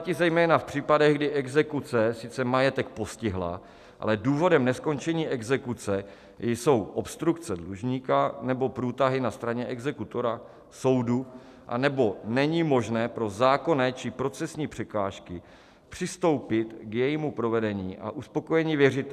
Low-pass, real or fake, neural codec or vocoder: 14.4 kHz; real; none